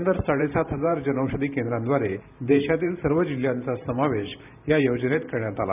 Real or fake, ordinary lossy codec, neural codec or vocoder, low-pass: fake; none; vocoder, 44.1 kHz, 128 mel bands every 512 samples, BigVGAN v2; 3.6 kHz